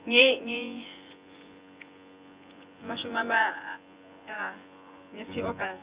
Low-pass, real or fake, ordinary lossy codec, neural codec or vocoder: 3.6 kHz; fake; Opus, 64 kbps; vocoder, 24 kHz, 100 mel bands, Vocos